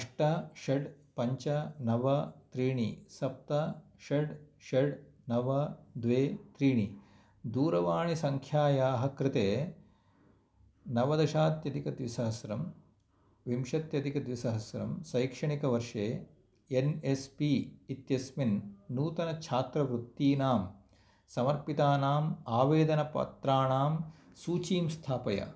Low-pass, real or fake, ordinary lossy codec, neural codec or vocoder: none; real; none; none